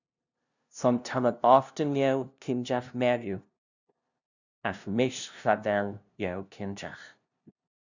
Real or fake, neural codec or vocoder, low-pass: fake; codec, 16 kHz, 0.5 kbps, FunCodec, trained on LibriTTS, 25 frames a second; 7.2 kHz